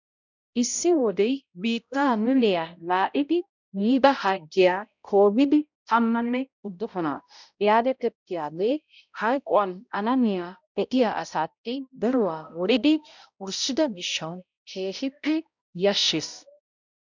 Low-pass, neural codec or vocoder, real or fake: 7.2 kHz; codec, 16 kHz, 0.5 kbps, X-Codec, HuBERT features, trained on balanced general audio; fake